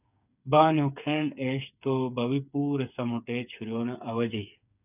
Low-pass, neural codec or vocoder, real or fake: 3.6 kHz; codec, 16 kHz, 8 kbps, FreqCodec, smaller model; fake